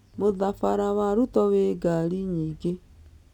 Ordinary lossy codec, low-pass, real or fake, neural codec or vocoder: none; 19.8 kHz; real; none